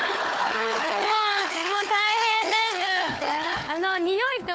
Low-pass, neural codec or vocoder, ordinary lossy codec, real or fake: none; codec, 16 kHz, 4 kbps, FunCodec, trained on Chinese and English, 50 frames a second; none; fake